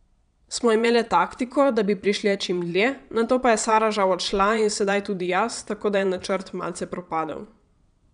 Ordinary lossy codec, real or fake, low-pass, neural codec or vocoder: none; fake; 9.9 kHz; vocoder, 22.05 kHz, 80 mel bands, Vocos